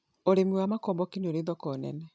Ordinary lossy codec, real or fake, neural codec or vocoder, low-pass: none; real; none; none